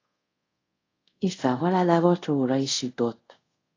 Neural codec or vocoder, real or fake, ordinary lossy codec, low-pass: codec, 24 kHz, 0.5 kbps, DualCodec; fake; AAC, 32 kbps; 7.2 kHz